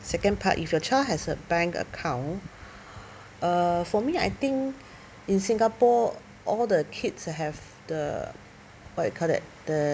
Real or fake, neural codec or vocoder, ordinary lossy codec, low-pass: real; none; none; none